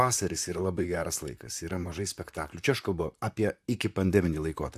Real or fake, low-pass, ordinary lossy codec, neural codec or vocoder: fake; 14.4 kHz; AAC, 96 kbps; vocoder, 44.1 kHz, 128 mel bands, Pupu-Vocoder